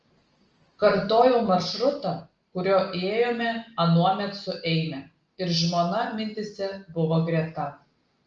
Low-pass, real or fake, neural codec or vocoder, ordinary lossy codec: 7.2 kHz; real; none; Opus, 24 kbps